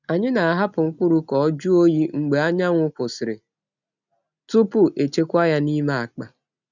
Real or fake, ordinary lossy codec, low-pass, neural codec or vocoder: real; none; 7.2 kHz; none